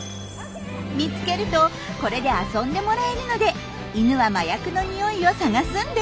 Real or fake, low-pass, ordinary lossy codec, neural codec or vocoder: real; none; none; none